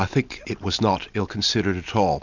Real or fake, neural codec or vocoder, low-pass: real; none; 7.2 kHz